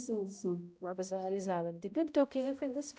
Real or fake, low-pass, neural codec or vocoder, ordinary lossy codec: fake; none; codec, 16 kHz, 0.5 kbps, X-Codec, HuBERT features, trained on balanced general audio; none